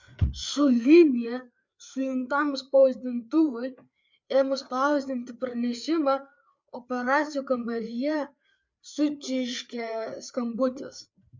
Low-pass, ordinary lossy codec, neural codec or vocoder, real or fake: 7.2 kHz; MP3, 64 kbps; codec, 16 kHz, 4 kbps, FreqCodec, larger model; fake